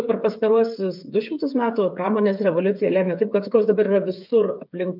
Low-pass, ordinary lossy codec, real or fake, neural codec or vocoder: 5.4 kHz; MP3, 48 kbps; fake; codec, 16 kHz, 8 kbps, FreqCodec, smaller model